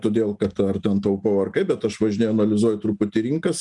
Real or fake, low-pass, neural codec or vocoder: real; 10.8 kHz; none